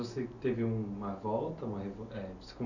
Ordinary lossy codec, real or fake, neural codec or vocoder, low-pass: none; real; none; 7.2 kHz